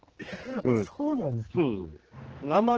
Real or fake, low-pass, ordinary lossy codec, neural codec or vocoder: fake; 7.2 kHz; Opus, 16 kbps; codec, 16 kHz, 2 kbps, X-Codec, HuBERT features, trained on general audio